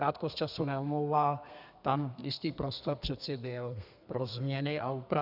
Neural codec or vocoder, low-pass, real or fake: codec, 32 kHz, 1.9 kbps, SNAC; 5.4 kHz; fake